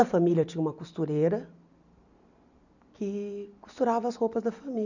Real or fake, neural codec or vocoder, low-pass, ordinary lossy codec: real; none; 7.2 kHz; none